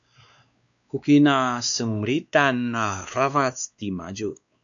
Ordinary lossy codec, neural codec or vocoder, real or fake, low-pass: MP3, 96 kbps; codec, 16 kHz, 2 kbps, X-Codec, WavLM features, trained on Multilingual LibriSpeech; fake; 7.2 kHz